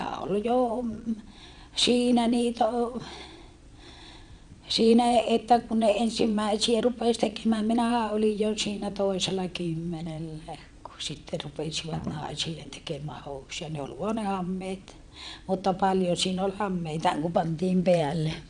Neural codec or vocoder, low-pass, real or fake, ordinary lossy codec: vocoder, 22.05 kHz, 80 mel bands, Vocos; 9.9 kHz; fake; none